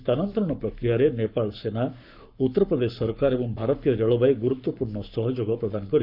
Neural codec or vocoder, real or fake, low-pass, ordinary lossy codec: codec, 44.1 kHz, 7.8 kbps, Pupu-Codec; fake; 5.4 kHz; none